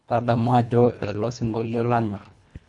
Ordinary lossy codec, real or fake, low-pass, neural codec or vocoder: none; fake; 10.8 kHz; codec, 24 kHz, 1.5 kbps, HILCodec